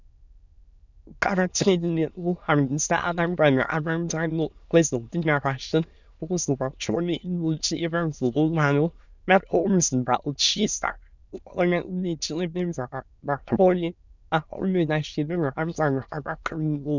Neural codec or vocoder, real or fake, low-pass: autoencoder, 22.05 kHz, a latent of 192 numbers a frame, VITS, trained on many speakers; fake; 7.2 kHz